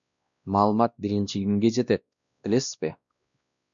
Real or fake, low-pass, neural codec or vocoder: fake; 7.2 kHz; codec, 16 kHz, 1 kbps, X-Codec, WavLM features, trained on Multilingual LibriSpeech